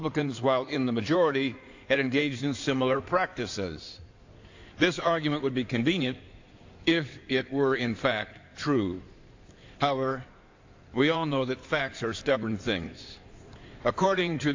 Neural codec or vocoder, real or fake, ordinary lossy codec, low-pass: codec, 16 kHz in and 24 kHz out, 2.2 kbps, FireRedTTS-2 codec; fake; AAC, 48 kbps; 7.2 kHz